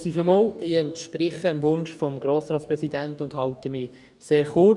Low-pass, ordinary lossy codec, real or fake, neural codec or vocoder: 10.8 kHz; none; fake; codec, 44.1 kHz, 2.6 kbps, DAC